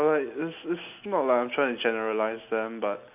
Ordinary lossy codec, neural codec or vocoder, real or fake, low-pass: none; none; real; 3.6 kHz